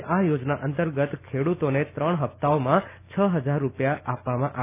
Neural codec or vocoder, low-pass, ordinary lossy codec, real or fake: none; 3.6 kHz; none; real